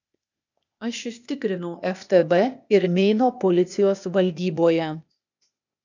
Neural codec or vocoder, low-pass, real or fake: codec, 16 kHz, 0.8 kbps, ZipCodec; 7.2 kHz; fake